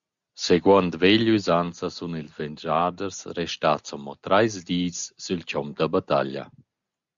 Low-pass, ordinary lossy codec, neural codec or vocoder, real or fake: 7.2 kHz; Opus, 64 kbps; none; real